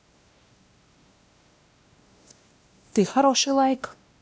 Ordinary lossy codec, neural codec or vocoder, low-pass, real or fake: none; codec, 16 kHz, 1 kbps, X-Codec, WavLM features, trained on Multilingual LibriSpeech; none; fake